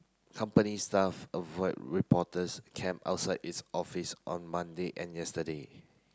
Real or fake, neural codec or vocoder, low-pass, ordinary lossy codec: real; none; none; none